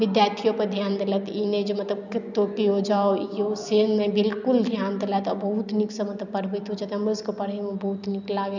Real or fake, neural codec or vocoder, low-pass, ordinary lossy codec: real; none; 7.2 kHz; none